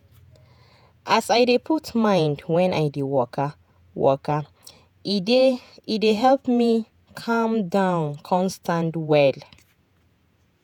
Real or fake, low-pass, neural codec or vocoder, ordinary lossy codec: fake; 19.8 kHz; vocoder, 48 kHz, 128 mel bands, Vocos; none